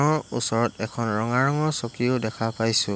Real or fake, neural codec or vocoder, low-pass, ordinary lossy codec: real; none; none; none